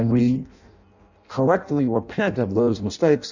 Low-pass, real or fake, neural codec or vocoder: 7.2 kHz; fake; codec, 16 kHz in and 24 kHz out, 0.6 kbps, FireRedTTS-2 codec